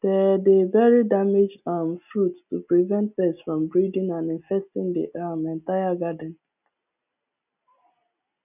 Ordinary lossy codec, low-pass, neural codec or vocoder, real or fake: none; 3.6 kHz; none; real